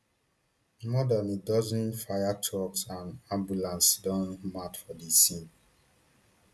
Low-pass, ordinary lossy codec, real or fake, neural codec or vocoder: none; none; real; none